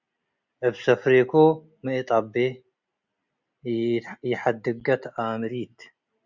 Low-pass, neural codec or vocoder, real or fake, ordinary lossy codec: 7.2 kHz; none; real; Opus, 64 kbps